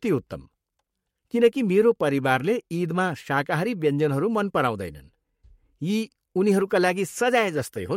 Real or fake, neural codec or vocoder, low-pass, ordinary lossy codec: fake; codec, 44.1 kHz, 7.8 kbps, Pupu-Codec; 19.8 kHz; MP3, 64 kbps